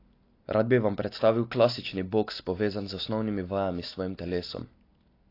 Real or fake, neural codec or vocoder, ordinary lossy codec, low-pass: real; none; AAC, 32 kbps; 5.4 kHz